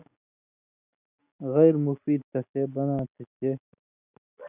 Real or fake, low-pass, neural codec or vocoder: real; 3.6 kHz; none